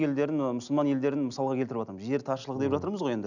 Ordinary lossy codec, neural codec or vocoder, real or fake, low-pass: none; none; real; 7.2 kHz